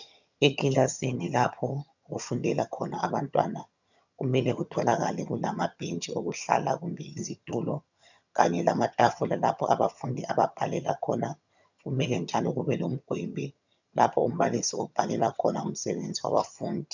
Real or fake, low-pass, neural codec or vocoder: fake; 7.2 kHz; vocoder, 22.05 kHz, 80 mel bands, HiFi-GAN